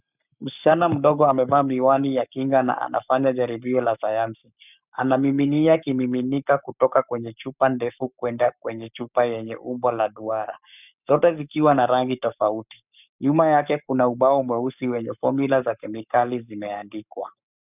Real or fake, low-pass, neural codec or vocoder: fake; 3.6 kHz; codec, 44.1 kHz, 7.8 kbps, Pupu-Codec